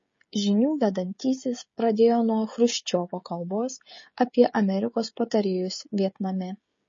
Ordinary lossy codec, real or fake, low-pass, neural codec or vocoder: MP3, 32 kbps; fake; 7.2 kHz; codec, 16 kHz, 16 kbps, FreqCodec, smaller model